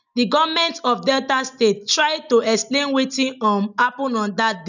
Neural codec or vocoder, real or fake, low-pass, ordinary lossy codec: none; real; 7.2 kHz; none